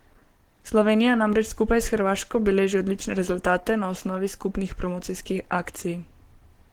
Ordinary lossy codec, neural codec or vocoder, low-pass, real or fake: Opus, 16 kbps; codec, 44.1 kHz, 7.8 kbps, Pupu-Codec; 19.8 kHz; fake